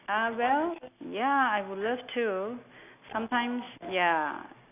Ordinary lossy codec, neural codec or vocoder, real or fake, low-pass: none; none; real; 3.6 kHz